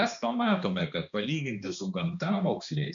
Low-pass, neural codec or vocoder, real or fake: 7.2 kHz; codec, 16 kHz, 2 kbps, X-Codec, HuBERT features, trained on balanced general audio; fake